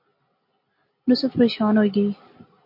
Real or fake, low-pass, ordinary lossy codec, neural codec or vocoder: real; 5.4 kHz; MP3, 32 kbps; none